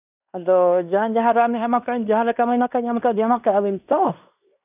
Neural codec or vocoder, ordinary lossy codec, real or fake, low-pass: codec, 16 kHz in and 24 kHz out, 0.9 kbps, LongCat-Audio-Codec, fine tuned four codebook decoder; none; fake; 3.6 kHz